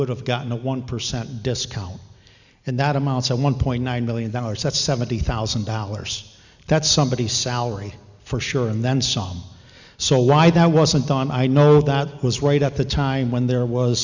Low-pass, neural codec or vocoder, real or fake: 7.2 kHz; none; real